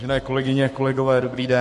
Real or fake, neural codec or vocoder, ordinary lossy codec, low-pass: fake; codec, 44.1 kHz, 7.8 kbps, Pupu-Codec; MP3, 48 kbps; 14.4 kHz